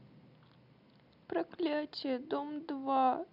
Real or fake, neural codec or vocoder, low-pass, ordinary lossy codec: real; none; 5.4 kHz; none